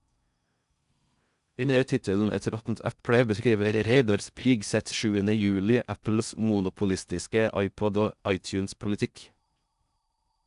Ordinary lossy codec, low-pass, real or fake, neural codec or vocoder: none; 10.8 kHz; fake; codec, 16 kHz in and 24 kHz out, 0.6 kbps, FocalCodec, streaming, 4096 codes